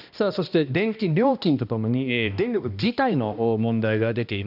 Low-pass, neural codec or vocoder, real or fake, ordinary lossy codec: 5.4 kHz; codec, 16 kHz, 1 kbps, X-Codec, HuBERT features, trained on balanced general audio; fake; none